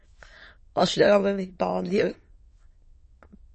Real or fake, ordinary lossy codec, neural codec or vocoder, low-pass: fake; MP3, 32 kbps; autoencoder, 22.05 kHz, a latent of 192 numbers a frame, VITS, trained on many speakers; 9.9 kHz